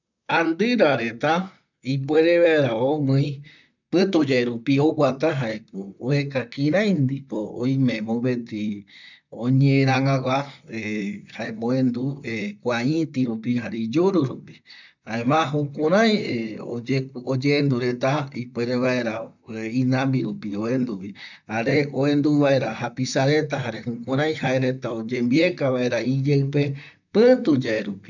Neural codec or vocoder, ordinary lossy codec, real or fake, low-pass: vocoder, 44.1 kHz, 128 mel bands, Pupu-Vocoder; none; fake; 7.2 kHz